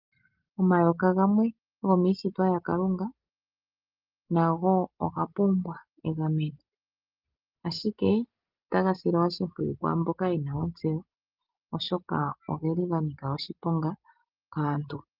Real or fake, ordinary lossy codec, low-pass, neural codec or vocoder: real; Opus, 32 kbps; 5.4 kHz; none